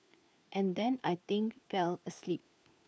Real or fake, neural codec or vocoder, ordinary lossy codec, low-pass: fake; codec, 16 kHz, 4 kbps, FunCodec, trained on LibriTTS, 50 frames a second; none; none